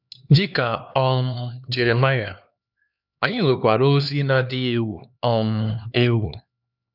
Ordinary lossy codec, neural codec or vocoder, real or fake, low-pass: none; codec, 16 kHz, 2 kbps, X-Codec, HuBERT features, trained on LibriSpeech; fake; 5.4 kHz